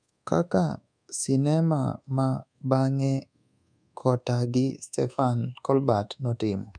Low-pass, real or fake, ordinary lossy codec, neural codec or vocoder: 9.9 kHz; fake; none; codec, 24 kHz, 1.2 kbps, DualCodec